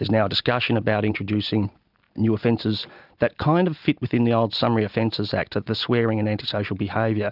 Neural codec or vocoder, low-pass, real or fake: none; 5.4 kHz; real